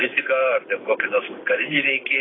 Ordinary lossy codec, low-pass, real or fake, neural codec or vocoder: AAC, 16 kbps; 7.2 kHz; fake; vocoder, 44.1 kHz, 128 mel bands every 512 samples, BigVGAN v2